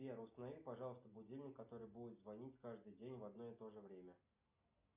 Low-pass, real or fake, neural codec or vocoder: 3.6 kHz; real; none